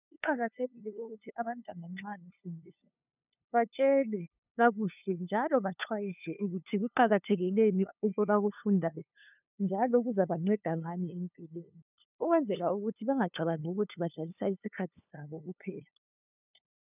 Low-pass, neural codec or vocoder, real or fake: 3.6 kHz; codec, 16 kHz, 2 kbps, FunCodec, trained on LibriTTS, 25 frames a second; fake